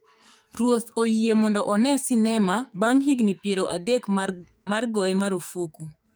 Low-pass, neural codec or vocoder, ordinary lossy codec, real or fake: none; codec, 44.1 kHz, 2.6 kbps, SNAC; none; fake